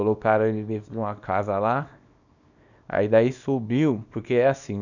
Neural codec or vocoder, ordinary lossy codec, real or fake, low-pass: codec, 24 kHz, 0.9 kbps, WavTokenizer, small release; none; fake; 7.2 kHz